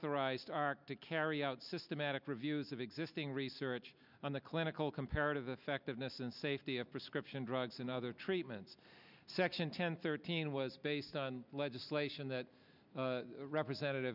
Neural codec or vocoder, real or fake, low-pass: none; real; 5.4 kHz